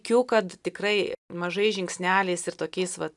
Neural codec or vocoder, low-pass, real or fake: none; 10.8 kHz; real